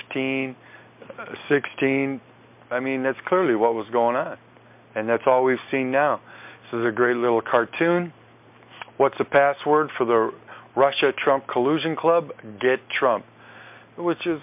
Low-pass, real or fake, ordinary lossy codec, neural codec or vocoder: 3.6 kHz; real; MP3, 32 kbps; none